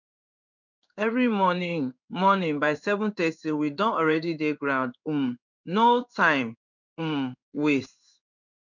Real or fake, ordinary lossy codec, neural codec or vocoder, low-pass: fake; none; codec, 16 kHz in and 24 kHz out, 1 kbps, XY-Tokenizer; 7.2 kHz